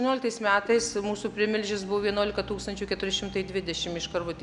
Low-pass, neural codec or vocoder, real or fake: 10.8 kHz; none; real